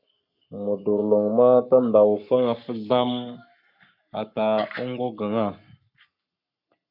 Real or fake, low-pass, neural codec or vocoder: fake; 5.4 kHz; codec, 44.1 kHz, 7.8 kbps, Pupu-Codec